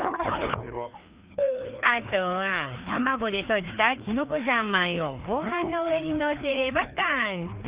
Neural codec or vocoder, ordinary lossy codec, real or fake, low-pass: codec, 16 kHz, 2 kbps, FreqCodec, larger model; Opus, 64 kbps; fake; 3.6 kHz